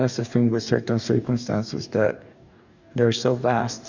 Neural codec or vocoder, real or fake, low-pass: codec, 44.1 kHz, 2.6 kbps, DAC; fake; 7.2 kHz